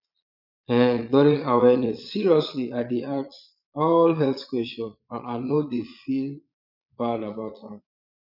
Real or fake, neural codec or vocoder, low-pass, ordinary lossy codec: fake; vocoder, 22.05 kHz, 80 mel bands, Vocos; 5.4 kHz; none